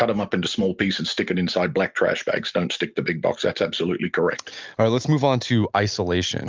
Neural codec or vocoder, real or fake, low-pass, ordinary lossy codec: none; real; 7.2 kHz; Opus, 24 kbps